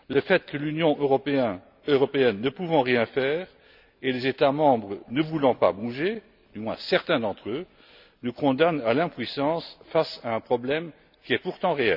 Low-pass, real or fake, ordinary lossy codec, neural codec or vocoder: 5.4 kHz; real; none; none